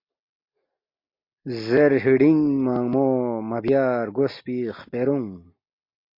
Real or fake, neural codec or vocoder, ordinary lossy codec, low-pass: real; none; MP3, 32 kbps; 5.4 kHz